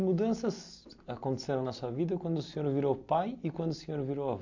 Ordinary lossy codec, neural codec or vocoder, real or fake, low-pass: AAC, 48 kbps; none; real; 7.2 kHz